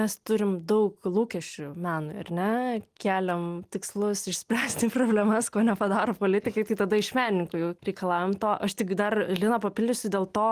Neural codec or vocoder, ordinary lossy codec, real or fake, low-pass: none; Opus, 24 kbps; real; 14.4 kHz